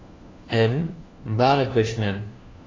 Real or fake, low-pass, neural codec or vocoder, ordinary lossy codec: fake; 7.2 kHz; codec, 16 kHz, 1 kbps, FunCodec, trained on LibriTTS, 50 frames a second; AAC, 32 kbps